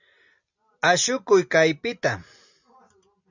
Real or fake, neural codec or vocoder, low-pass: real; none; 7.2 kHz